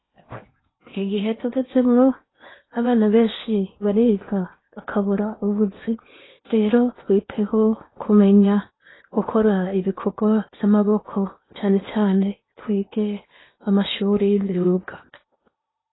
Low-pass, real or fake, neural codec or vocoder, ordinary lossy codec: 7.2 kHz; fake; codec, 16 kHz in and 24 kHz out, 0.8 kbps, FocalCodec, streaming, 65536 codes; AAC, 16 kbps